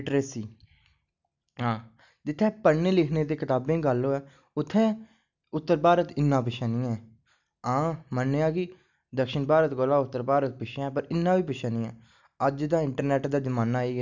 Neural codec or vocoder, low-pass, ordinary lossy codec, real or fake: none; 7.2 kHz; none; real